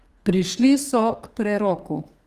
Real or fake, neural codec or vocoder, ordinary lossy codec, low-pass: fake; codec, 32 kHz, 1.9 kbps, SNAC; Opus, 24 kbps; 14.4 kHz